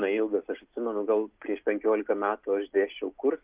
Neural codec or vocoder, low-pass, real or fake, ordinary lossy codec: none; 3.6 kHz; real; Opus, 24 kbps